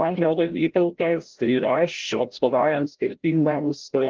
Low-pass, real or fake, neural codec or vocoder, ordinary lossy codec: 7.2 kHz; fake; codec, 16 kHz, 0.5 kbps, FreqCodec, larger model; Opus, 16 kbps